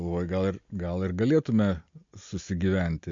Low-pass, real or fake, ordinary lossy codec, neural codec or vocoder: 7.2 kHz; real; MP3, 48 kbps; none